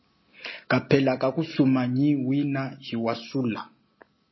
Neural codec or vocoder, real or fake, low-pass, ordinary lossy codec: none; real; 7.2 kHz; MP3, 24 kbps